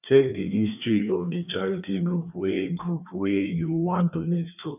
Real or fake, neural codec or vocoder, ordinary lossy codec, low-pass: fake; codec, 16 kHz, 2 kbps, FreqCodec, larger model; none; 3.6 kHz